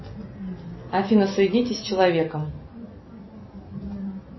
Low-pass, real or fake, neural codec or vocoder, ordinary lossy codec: 7.2 kHz; real; none; MP3, 24 kbps